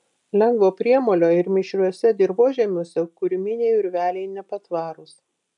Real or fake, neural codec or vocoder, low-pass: real; none; 10.8 kHz